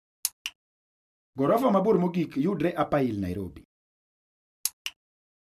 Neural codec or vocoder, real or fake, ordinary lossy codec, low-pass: none; real; none; 14.4 kHz